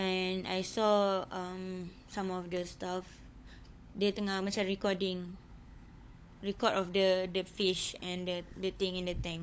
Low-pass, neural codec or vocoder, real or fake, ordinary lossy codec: none; codec, 16 kHz, 16 kbps, FunCodec, trained on LibriTTS, 50 frames a second; fake; none